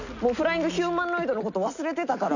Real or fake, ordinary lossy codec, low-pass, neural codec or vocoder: real; none; 7.2 kHz; none